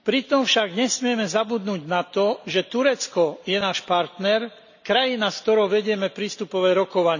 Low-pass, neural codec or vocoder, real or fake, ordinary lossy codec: 7.2 kHz; none; real; none